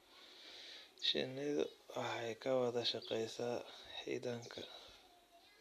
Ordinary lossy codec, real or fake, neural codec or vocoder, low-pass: none; real; none; 14.4 kHz